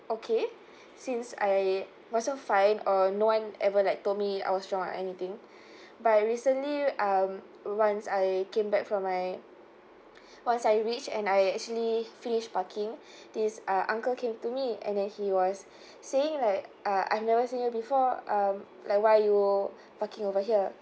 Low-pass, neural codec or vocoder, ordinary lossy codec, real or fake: none; none; none; real